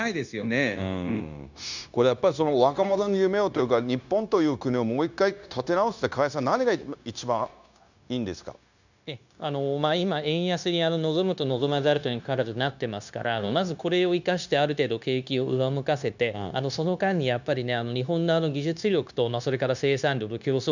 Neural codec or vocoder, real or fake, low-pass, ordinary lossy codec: codec, 16 kHz, 0.9 kbps, LongCat-Audio-Codec; fake; 7.2 kHz; none